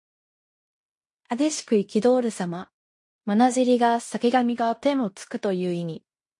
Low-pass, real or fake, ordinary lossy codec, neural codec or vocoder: 10.8 kHz; fake; MP3, 48 kbps; codec, 16 kHz in and 24 kHz out, 0.9 kbps, LongCat-Audio-Codec, fine tuned four codebook decoder